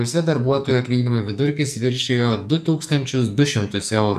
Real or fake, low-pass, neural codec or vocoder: fake; 14.4 kHz; codec, 44.1 kHz, 2.6 kbps, SNAC